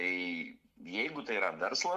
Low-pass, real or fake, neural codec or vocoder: 14.4 kHz; real; none